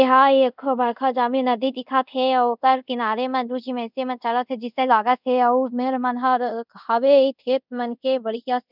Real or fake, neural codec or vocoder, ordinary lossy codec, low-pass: fake; codec, 24 kHz, 0.5 kbps, DualCodec; none; 5.4 kHz